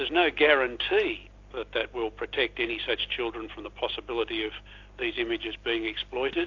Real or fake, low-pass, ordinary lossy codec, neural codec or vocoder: real; 7.2 kHz; AAC, 48 kbps; none